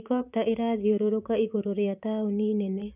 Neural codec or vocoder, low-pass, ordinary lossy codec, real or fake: vocoder, 44.1 kHz, 128 mel bands, Pupu-Vocoder; 3.6 kHz; none; fake